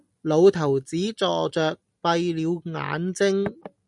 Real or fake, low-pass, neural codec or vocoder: real; 10.8 kHz; none